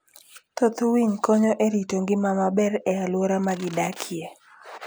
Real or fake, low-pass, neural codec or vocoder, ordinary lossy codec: real; none; none; none